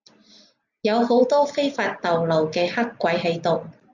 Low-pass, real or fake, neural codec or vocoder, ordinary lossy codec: 7.2 kHz; real; none; Opus, 64 kbps